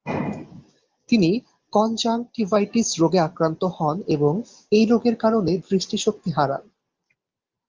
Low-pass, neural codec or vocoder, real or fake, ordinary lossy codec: 7.2 kHz; none; real; Opus, 32 kbps